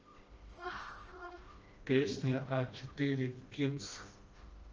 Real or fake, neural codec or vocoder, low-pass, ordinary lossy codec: fake; codec, 16 kHz, 1 kbps, FreqCodec, smaller model; 7.2 kHz; Opus, 24 kbps